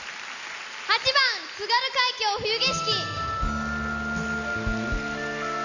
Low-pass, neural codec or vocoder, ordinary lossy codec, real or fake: 7.2 kHz; none; none; real